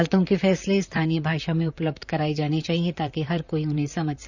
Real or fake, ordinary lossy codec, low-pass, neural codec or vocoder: fake; none; 7.2 kHz; vocoder, 44.1 kHz, 128 mel bands, Pupu-Vocoder